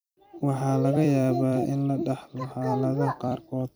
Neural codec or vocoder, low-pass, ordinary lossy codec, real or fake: vocoder, 44.1 kHz, 128 mel bands every 256 samples, BigVGAN v2; none; none; fake